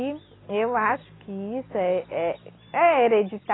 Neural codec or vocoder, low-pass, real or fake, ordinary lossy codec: none; 7.2 kHz; real; AAC, 16 kbps